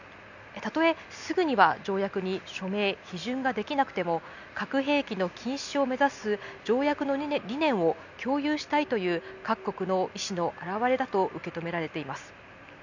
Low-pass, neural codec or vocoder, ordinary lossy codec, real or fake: 7.2 kHz; none; none; real